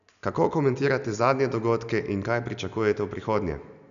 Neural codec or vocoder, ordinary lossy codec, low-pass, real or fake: none; none; 7.2 kHz; real